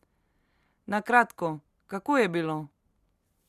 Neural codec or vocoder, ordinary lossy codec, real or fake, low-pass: none; Opus, 64 kbps; real; 14.4 kHz